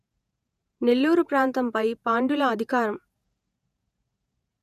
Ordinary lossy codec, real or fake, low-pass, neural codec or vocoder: none; fake; 14.4 kHz; vocoder, 48 kHz, 128 mel bands, Vocos